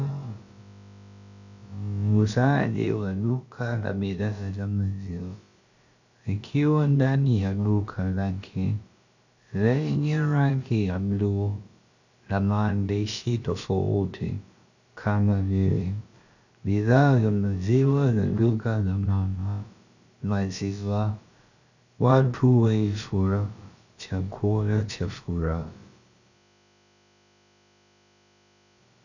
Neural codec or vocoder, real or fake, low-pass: codec, 16 kHz, about 1 kbps, DyCAST, with the encoder's durations; fake; 7.2 kHz